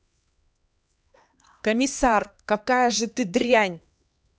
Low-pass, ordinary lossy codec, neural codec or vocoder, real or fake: none; none; codec, 16 kHz, 2 kbps, X-Codec, HuBERT features, trained on LibriSpeech; fake